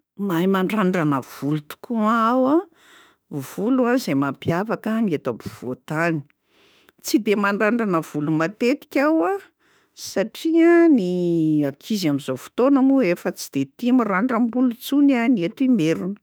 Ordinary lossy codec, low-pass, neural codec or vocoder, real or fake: none; none; autoencoder, 48 kHz, 32 numbers a frame, DAC-VAE, trained on Japanese speech; fake